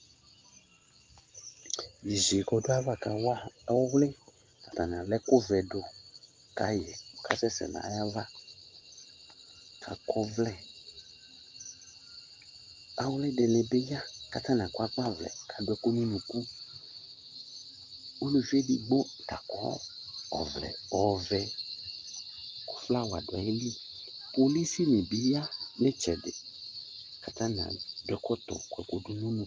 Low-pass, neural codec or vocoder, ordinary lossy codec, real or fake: 7.2 kHz; none; Opus, 32 kbps; real